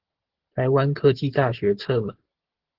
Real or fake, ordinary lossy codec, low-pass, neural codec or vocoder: real; Opus, 16 kbps; 5.4 kHz; none